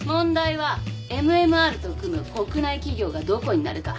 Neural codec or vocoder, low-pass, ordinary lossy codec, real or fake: none; none; none; real